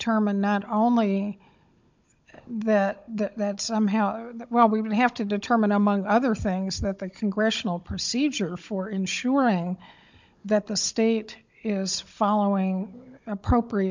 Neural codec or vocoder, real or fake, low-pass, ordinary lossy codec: codec, 16 kHz, 16 kbps, FunCodec, trained on Chinese and English, 50 frames a second; fake; 7.2 kHz; MP3, 64 kbps